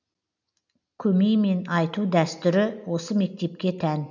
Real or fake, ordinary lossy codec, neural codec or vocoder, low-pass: real; none; none; 7.2 kHz